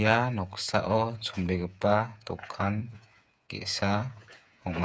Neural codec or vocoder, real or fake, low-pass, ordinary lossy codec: codec, 16 kHz, 8 kbps, FreqCodec, smaller model; fake; none; none